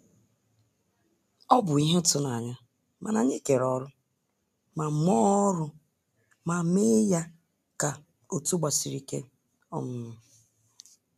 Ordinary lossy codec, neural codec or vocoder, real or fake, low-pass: none; none; real; 14.4 kHz